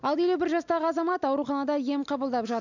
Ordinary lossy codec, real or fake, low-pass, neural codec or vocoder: none; real; 7.2 kHz; none